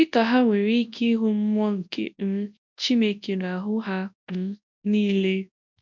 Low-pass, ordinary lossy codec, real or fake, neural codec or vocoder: 7.2 kHz; MP3, 64 kbps; fake; codec, 24 kHz, 0.9 kbps, WavTokenizer, large speech release